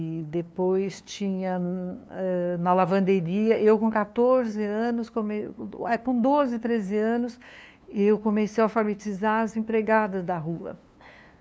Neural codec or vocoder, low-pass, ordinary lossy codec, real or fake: codec, 16 kHz, 2 kbps, FunCodec, trained on LibriTTS, 25 frames a second; none; none; fake